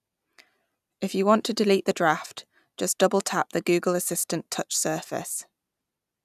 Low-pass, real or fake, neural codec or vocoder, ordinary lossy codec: 14.4 kHz; real; none; none